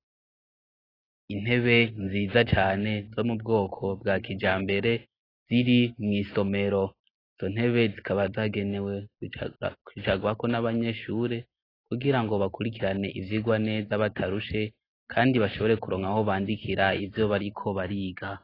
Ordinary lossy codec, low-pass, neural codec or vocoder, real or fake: AAC, 24 kbps; 5.4 kHz; none; real